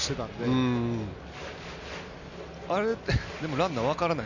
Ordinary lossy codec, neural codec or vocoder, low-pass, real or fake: none; none; 7.2 kHz; real